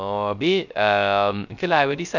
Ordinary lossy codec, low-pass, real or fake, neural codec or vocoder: none; 7.2 kHz; fake; codec, 16 kHz, 0.3 kbps, FocalCodec